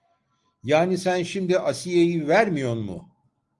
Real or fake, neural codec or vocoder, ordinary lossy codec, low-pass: real; none; Opus, 24 kbps; 10.8 kHz